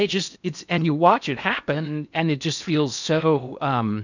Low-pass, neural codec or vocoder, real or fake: 7.2 kHz; codec, 16 kHz in and 24 kHz out, 0.8 kbps, FocalCodec, streaming, 65536 codes; fake